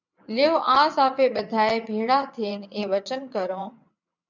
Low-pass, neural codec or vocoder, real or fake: 7.2 kHz; vocoder, 22.05 kHz, 80 mel bands, WaveNeXt; fake